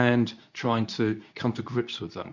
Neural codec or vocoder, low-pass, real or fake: codec, 24 kHz, 0.9 kbps, WavTokenizer, medium speech release version 2; 7.2 kHz; fake